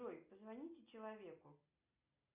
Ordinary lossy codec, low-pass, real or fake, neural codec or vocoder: Opus, 64 kbps; 3.6 kHz; real; none